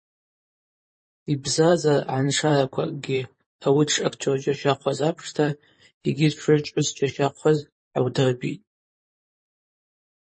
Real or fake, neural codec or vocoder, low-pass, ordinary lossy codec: fake; vocoder, 44.1 kHz, 128 mel bands, Pupu-Vocoder; 9.9 kHz; MP3, 32 kbps